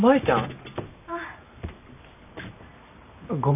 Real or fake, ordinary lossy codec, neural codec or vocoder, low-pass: real; none; none; 3.6 kHz